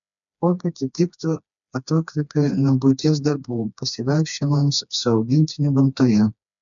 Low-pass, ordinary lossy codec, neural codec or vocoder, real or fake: 7.2 kHz; MP3, 96 kbps; codec, 16 kHz, 2 kbps, FreqCodec, smaller model; fake